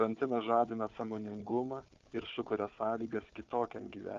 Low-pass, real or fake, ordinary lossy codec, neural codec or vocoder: 7.2 kHz; fake; Opus, 32 kbps; codec, 16 kHz, 4 kbps, FunCodec, trained on Chinese and English, 50 frames a second